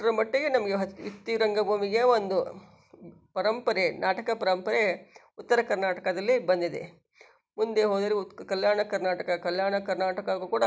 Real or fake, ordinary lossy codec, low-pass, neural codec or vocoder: real; none; none; none